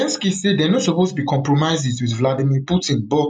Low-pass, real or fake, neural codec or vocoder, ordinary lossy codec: none; real; none; none